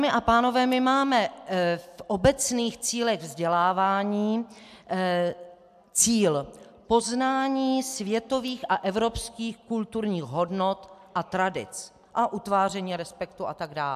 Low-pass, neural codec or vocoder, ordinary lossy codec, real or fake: 14.4 kHz; none; AAC, 96 kbps; real